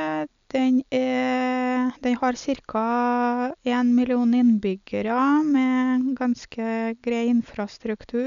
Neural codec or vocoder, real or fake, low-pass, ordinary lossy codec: none; real; 7.2 kHz; none